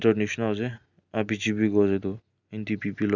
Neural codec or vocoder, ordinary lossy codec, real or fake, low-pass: none; none; real; 7.2 kHz